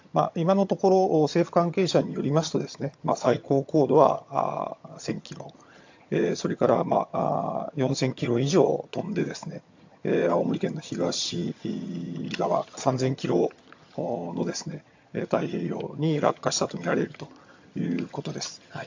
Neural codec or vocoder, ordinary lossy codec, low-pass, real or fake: vocoder, 22.05 kHz, 80 mel bands, HiFi-GAN; AAC, 48 kbps; 7.2 kHz; fake